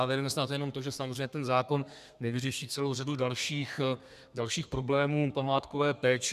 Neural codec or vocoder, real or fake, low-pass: codec, 32 kHz, 1.9 kbps, SNAC; fake; 14.4 kHz